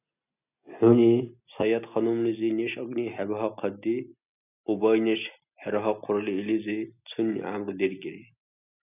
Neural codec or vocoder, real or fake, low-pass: none; real; 3.6 kHz